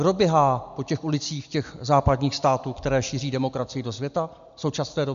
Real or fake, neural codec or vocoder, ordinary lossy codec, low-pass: real; none; MP3, 64 kbps; 7.2 kHz